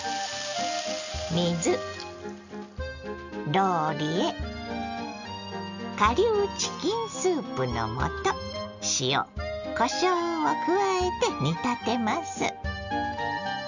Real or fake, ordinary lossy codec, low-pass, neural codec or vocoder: real; none; 7.2 kHz; none